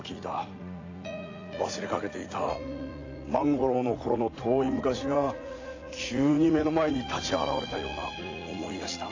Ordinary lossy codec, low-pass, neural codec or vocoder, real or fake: AAC, 32 kbps; 7.2 kHz; vocoder, 44.1 kHz, 80 mel bands, Vocos; fake